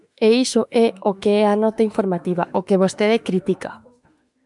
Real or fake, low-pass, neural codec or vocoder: fake; 10.8 kHz; autoencoder, 48 kHz, 32 numbers a frame, DAC-VAE, trained on Japanese speech